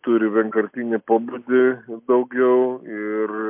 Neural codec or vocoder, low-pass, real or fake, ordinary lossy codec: none; 3.6 kHz; real; MP3, 32 kbps